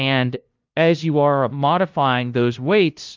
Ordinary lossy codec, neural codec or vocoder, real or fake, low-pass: Opus, 24 kbps; codec, 16 kHz, 0.5 kbps, FunCodec, trained on LibriTTS, 25 frames a second; fake; 7.2 kHz